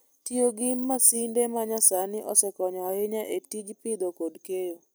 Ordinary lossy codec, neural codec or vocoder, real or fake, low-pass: none; none; real; none